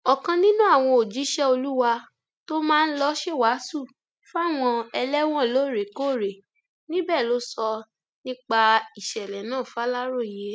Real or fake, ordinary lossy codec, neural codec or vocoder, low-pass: real; none; none; none